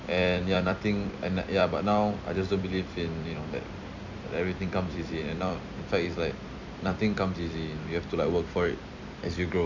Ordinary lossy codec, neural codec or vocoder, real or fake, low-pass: none; vocoder, 44.1 kHz, 128 mel bands every 256 samples, BigVGAN v2; fake; 7.2 kHz